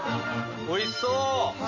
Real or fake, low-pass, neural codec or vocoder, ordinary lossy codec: real; 7.2 kHz; none; AAC, 48 kbps